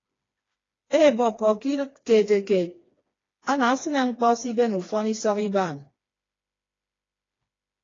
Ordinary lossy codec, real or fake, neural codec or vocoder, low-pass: AAC, 32 kbps; fake; codec, 16 kHz, 2 kbps, FreqCodec, smaller model; 7.2 kHz